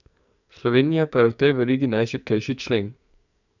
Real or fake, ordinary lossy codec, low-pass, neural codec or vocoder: fake; none; 7.2 kHz; codec, 44.1 kHz, 2.6 kbps, SNAC